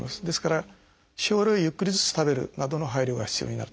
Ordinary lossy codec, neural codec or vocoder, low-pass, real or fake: none; none; none; real